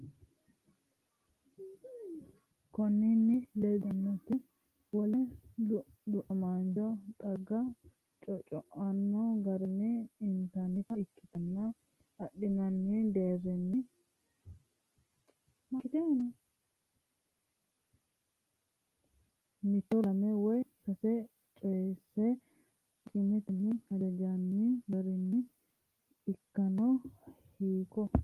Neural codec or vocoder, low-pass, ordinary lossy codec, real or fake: none; 14.4 kHz; Opus, 24 kbps; real